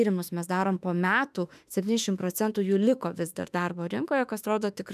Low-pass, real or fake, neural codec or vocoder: 14.4 kHz; fake; autoencoder, 48 kHz, 32 numbers a frame, DAC-VAE, trained on Japanese speech